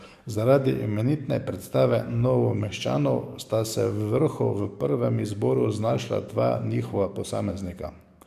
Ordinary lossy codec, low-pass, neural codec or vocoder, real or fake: MP3, 96 kbps; 14.4 kHz; codec, 44.1 kHz, 7.8 kbps, DAC; fake